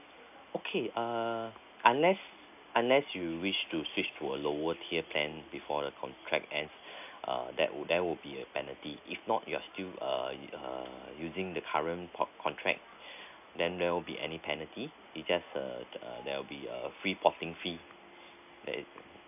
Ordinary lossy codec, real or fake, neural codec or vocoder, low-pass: none; real; none; 3.6 kHz